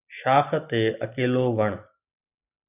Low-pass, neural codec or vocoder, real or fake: 3.6 kHz; none; real